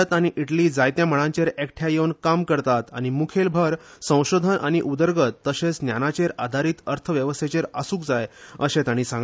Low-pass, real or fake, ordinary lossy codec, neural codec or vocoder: none; real; none; none